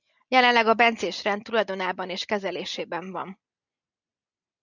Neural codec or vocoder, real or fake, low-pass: none; real; 7.2 kHz